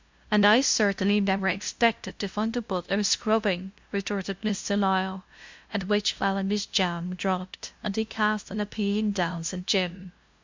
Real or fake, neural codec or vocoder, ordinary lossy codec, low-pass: fake; codec, 16 kHz, 0.5 kbps, FunCodec, trained on LibriTTS, 25 frames a second; MP3, 64 kbps; 7.2 kHz